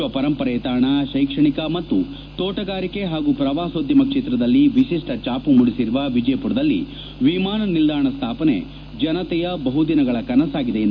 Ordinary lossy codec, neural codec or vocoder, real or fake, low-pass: none; none; real; 7.2 kHz